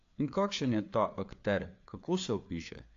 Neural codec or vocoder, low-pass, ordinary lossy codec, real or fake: codec, 16 kHz, 4 kbps, FreqCodec, larger model; 7.2 kHz; AAC, 48 kbps; fake